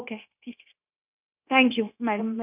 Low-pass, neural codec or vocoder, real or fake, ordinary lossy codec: 3.6 kHz; codec, 16 kHz, 0.9 kbps, LongCat-Audio-Codec; fake; none